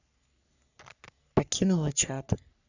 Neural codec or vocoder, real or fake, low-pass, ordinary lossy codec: codec, 44.1 kHz, 3.4 kbps, Pupu-Codec; fake; 7.2 kHz; none